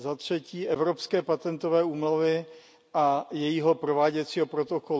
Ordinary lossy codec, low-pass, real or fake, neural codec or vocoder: none; none; real; none